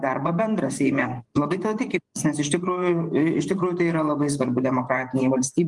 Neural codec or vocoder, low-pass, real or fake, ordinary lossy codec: vocoder, 24 kHz, 100 mel bands, Vocos; 10.8 kHz; fake; Opus, 24 kbps